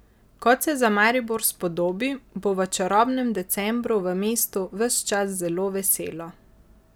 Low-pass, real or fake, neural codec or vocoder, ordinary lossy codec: none; real; none; none